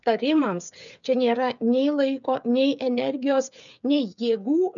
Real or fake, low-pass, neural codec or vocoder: fake; 7.2 kHz; codec, 16 kHz, 8 kbps, FreqCodec, smaller model